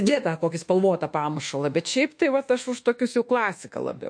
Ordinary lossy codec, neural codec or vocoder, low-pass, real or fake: MP3, 48 kbps; codec, 24 kHz, 1.2 kbps, DualCodec; 9.9 kHz; fake